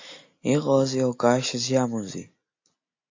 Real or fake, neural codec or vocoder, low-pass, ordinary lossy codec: real; none; 7.2 kHz; AAC, 48 kbps